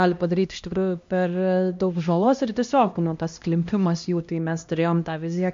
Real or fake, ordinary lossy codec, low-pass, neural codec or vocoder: fake; MP3, 48 kbps; 7.2 kHz; codec, 16 kHz, 1 kbps, X-Codec, HuBERT features, trained on LibriSpeech